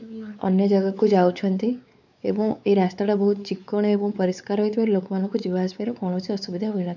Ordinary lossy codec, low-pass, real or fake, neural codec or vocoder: none; 7.2 kHz; fake; codec, 16 kHz, 4 kbps, X-Codec, WavLM features, trained on Multilingual LibriSpeech